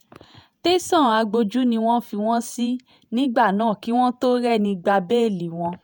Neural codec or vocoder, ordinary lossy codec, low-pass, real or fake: vocoder, 48 kHz, 128 mel bands, Vocos; none; none; fake